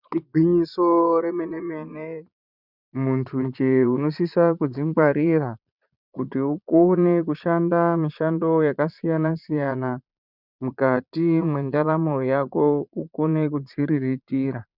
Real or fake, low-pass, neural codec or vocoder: fake; 5.4 kHz; vocoder, 22.05 kHz, 80 mel bands, Vocos